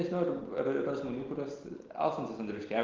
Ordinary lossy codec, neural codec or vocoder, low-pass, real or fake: Opus, 16 kbps; none; 7.2 kHz; real